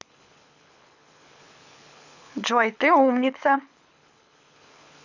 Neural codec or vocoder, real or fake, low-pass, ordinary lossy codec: codec, 24 kHz, 6 kbps, HILCodec; fake; 7.2 kHz; none